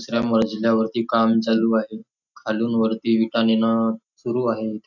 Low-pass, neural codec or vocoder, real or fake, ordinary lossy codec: 7.2 kHz; none; real; none